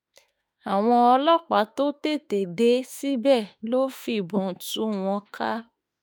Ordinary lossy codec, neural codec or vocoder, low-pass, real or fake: none; autoencoder, 48 kHz, 32 numbers a frame, DAC-VAE, trained on Japanese speech; none; fake